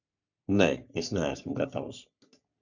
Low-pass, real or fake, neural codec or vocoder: 7.2 kHz; fake; codec, 44.1 kHz, 3.4 kbps, Pupu-Codec